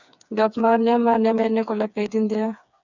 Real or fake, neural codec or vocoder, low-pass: fake; codec, 16 kHz, 4 kbps, FreqCodec, smaller model; 7.2 kHz